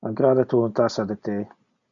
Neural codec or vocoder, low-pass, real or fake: none; 7.2 kHz; real